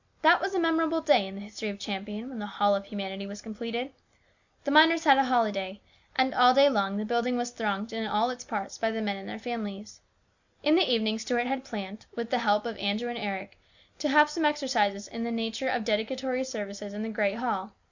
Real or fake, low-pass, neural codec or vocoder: real; 7.2 kHz; none